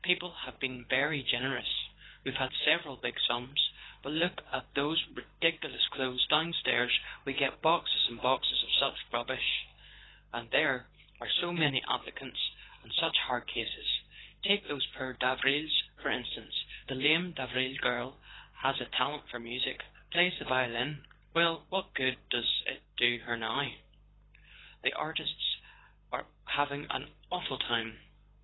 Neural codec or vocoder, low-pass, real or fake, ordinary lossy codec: codec, 24 kHz, 6 kbps, HILCodec; 7.2 kHz; fake; AAC, 16 kbps